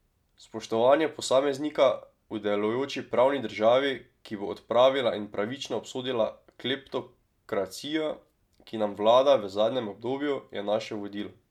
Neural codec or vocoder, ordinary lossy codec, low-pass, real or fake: none; MP3, 96 kbps; 19.8 kHz; real